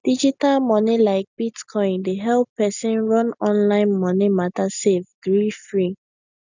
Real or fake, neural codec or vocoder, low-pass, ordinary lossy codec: real; none; 7.2 kHz; none